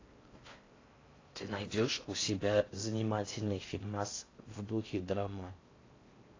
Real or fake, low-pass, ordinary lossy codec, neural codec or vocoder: fake; 7.2 kHz; AAC, 32 kbps; codec, 16 kHz in and 24 kHz out, 0.6 kbps, FocalCodec, streaming, 4096 codes